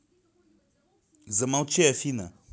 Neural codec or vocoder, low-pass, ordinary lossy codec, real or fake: none; none; none; real